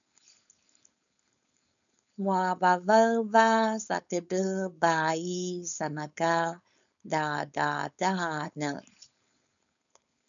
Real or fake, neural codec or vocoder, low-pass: fake; codec, 16 kHz, 4.8 kbps, FACodec; 7.2 kHz